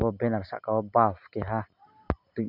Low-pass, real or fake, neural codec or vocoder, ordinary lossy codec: 5.4 kHz; real; none; none